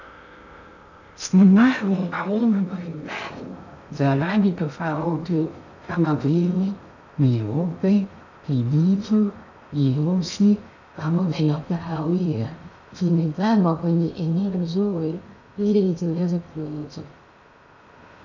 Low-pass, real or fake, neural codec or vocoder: 7.2 kHz; fake; codec, 16 kHz in and 24 kHz out, 0.6 kbps, FocalCodec, streaming, 2048 codes